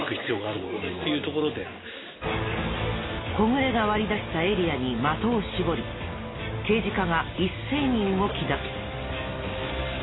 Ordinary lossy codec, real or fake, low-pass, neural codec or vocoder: AAC, 16 kbps; real; 7.2 kHz; none